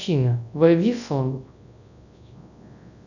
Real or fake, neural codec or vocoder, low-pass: fake; codec, 24 kHz, 0.9 kbps, WavTokenizer, large speech release; 7.2 kHz